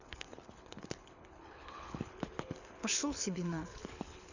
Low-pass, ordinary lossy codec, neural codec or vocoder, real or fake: 7.2 kHz; none; codec, 24 kHz, 6 kbps, HILCodec; fake